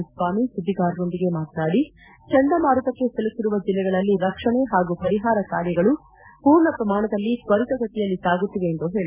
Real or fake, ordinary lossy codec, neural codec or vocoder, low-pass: real; none; none; 3.6 kHz